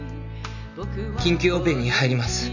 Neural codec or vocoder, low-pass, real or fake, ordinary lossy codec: none; 7.2 kHz; real; none